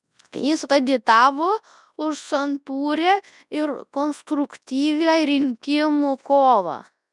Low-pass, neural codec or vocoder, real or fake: 10.8 kHz; codec, 24 kHz, 0.9 kbps, WavTokenizer, large speech release; fake